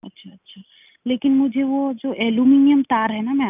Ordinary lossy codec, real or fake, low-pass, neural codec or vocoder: none; real; 3.6 kHz; none